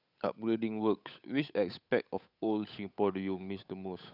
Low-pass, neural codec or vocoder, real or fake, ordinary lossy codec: 5.4 kHz; codec, 16 kHz, 8 kbps, FunCodec, trained on Chinese and English, 25 frames a second; fake; none